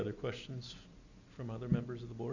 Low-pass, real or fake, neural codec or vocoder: 7.2 kHz; real; none